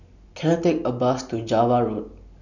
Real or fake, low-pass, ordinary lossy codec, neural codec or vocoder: real; 7.2 kHz; none; none